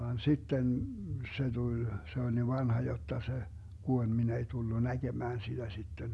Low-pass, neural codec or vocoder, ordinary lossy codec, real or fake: none; none; none; real